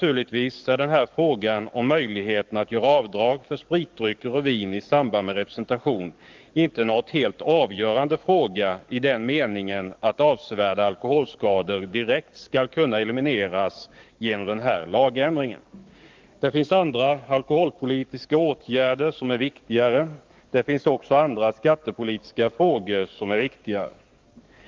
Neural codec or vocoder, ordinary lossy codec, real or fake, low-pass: codec, 44.1 kHz, 7.8 kbps, DAC; Opus, 32 kbps; fake; 7.2 kHz